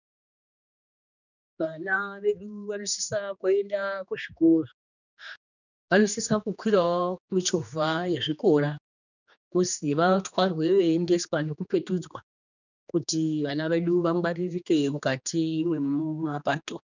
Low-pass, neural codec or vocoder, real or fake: 7.2 kHz; codec, 16 kHz, 2 kbps, X-Codec, HuBERT features, trained on general audio; fake